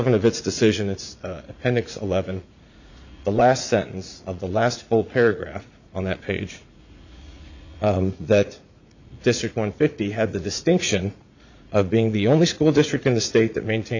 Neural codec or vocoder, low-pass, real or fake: vocoder, 44.1 kHz, 80 mel bands, Vocos; 7.2 kHz; fake